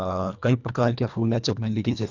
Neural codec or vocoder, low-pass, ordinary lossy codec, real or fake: codec, 24 kHz, 1.5 kbps, HILCodec; 7.2 kHz; none; fake